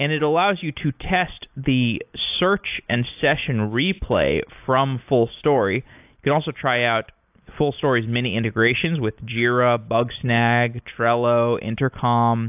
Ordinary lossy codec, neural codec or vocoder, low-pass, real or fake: AAC, 32 kbps; none; 3.6 kHz; real